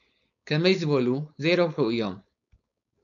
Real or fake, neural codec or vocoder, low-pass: fake; codec, 16 kHz, 4.8 kbps, FACodec; 7.2 kHz